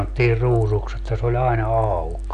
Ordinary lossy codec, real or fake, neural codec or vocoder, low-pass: none; real; none; 9.9 kHz